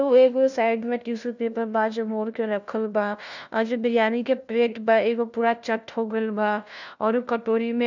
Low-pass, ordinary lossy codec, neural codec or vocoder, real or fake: 7.2 kHz; none; codec, 16 kHz, 1 kbps, FunCodec, trained on LibriTTS, 50 frames a second; fake